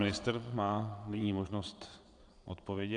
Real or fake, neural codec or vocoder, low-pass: fake; vocoder, 22.05 kHz, 80 mel bands, Vocos; 9.9 kHz